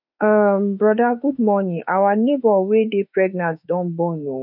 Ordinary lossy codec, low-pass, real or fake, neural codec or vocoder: none; 5.4 kHz; fake; autoencoder, 48 kHz, 32 numbers a frame, DAC-VAE, trained on Japanese speech